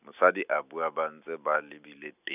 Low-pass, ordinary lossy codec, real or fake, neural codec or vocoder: 3.6 kHz; none; real; none